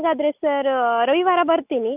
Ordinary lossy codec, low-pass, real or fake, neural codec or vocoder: none; 3.6 kHz; real; none